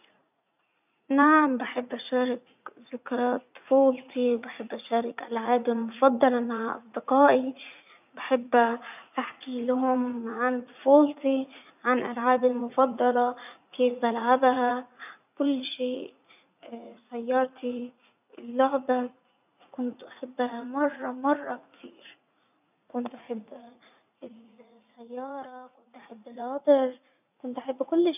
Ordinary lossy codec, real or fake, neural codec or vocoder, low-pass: none; fake; vocoder, 44.1 kHz, 80 mel bands, Vocos; 3.6 kHz